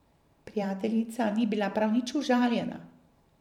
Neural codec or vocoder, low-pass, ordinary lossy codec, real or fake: vocoder, 44.1 kHz, 128 mel bands every 512 samples, BigVGAN v2; 19.8 kHz; none; fake